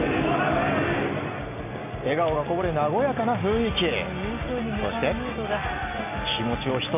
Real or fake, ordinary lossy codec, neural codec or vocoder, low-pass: real; none; none; 3.6 kHz